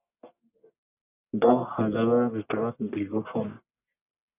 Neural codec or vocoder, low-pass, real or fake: codec, 44.1 kHz, 1.7 kbps, Pupu-Codec; 3.6 kHz; fake